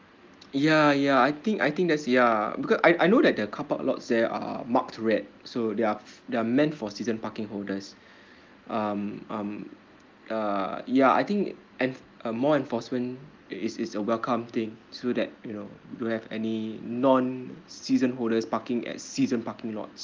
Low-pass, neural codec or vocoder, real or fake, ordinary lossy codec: 7.2 kHz; none; real; Opus, 32 kbps